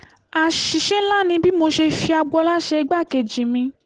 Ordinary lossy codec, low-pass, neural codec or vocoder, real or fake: Opus, 16 kbps; 9.9 kHz; none; real